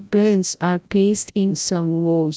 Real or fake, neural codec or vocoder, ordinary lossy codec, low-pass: fake; codec, 16 kHz, 0.5 kbps, FreqCodec, larger model; none; none